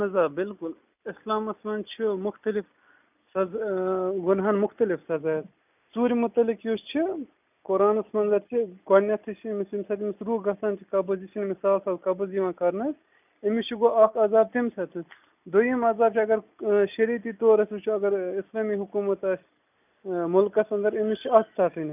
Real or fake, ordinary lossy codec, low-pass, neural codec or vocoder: real; none; 3.6 kHz; none